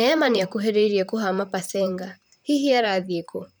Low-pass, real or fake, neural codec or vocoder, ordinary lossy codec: none; fake; vocoder, 44.1 kHz, 128 mel bands, Pupu-Vocoder; none